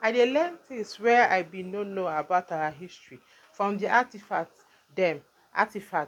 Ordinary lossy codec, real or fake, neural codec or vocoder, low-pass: none; real; none; 19.8 kHz